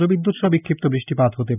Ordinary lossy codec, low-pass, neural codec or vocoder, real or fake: none; 3.6 kHz; none; real